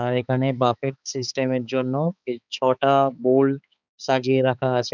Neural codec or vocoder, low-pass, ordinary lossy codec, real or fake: codec, 16 kHz, 4 kbps, X-Codec, HuBERT features, trained on balanced general audio; 7.2 kHz; none; fake